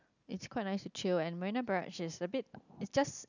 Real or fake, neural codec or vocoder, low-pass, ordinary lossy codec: real; none; 7.2 kHz; none